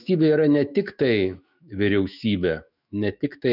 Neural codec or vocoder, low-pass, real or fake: autoencoder, 48 kHz, 128 numbers a frame, DAC-VAE, trained on Japanese speech; 5.4 kHz; fake